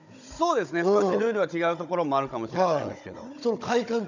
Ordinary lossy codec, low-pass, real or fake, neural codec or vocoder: none; 7.2 kHz; fake; codec, 16 kHz, 16 kbps, FunCodec, trained on Chinese and English, 50 frames a second